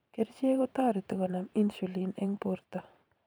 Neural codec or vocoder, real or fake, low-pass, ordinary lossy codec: vocoder, 44.1 kHz, 128 mel bands every 512 samples, BigVGAN v2; fake; none; none